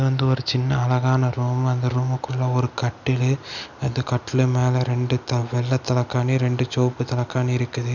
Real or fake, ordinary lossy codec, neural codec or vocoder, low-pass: real; none; none; 7.2 kHz